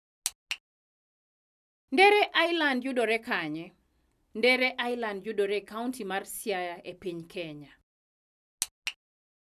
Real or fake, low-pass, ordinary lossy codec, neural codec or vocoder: real; 14.4 kHz; none; none